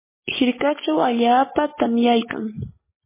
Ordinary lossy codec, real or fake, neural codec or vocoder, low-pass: MP3, 16 kbps; real; none; 3.6 kHz